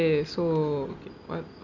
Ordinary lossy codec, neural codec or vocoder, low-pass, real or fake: none; none; 7.2 kHz; real